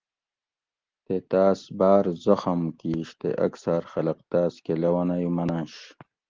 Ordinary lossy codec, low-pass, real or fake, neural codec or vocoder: Opus, 16 kbps; 7.2 kHz; real; none